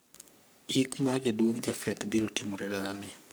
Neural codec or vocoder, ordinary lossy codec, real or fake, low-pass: codec, 44.1 kHz, 3.4 kbps, Pupu-Codec; none; fake; none